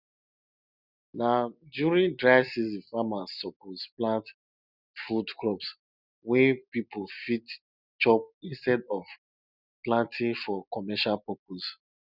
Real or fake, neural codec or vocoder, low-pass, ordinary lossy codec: real; none; 5.4 kHz; none